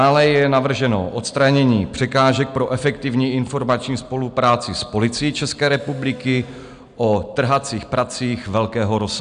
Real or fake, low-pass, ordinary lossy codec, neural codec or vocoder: real; 9.9 kHz; MP3, 96 kbps; none